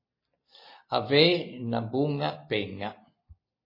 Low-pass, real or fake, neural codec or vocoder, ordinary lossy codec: 5.4 kHz; real; none; MP3, 24 kbps